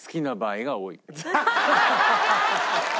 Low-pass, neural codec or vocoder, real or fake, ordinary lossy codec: none; none; real; none